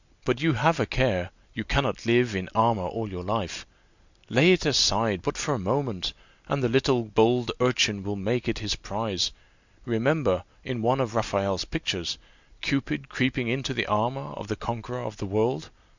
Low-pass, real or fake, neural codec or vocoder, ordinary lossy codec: 7.2 kHz; real; none; Opus, 64 kbps